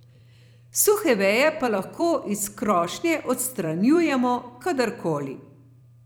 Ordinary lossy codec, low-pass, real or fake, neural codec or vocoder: none; none; fake; vocoder, 44.1 kHz, 128 mel bands every 256 samples, BigVGAN v2